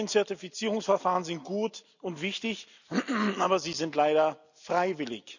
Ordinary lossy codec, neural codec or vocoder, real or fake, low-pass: none; none; real; 7.2 kHz